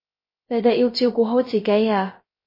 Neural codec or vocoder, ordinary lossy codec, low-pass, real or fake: codec, 16 kHz, 0.3 kbps, FocalCodec; MP3, 24 kbps; 5.4 kHz; fake